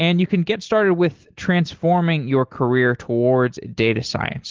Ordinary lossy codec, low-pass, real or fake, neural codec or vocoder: Opus, 16 kbps; 7.2 kHz; real; none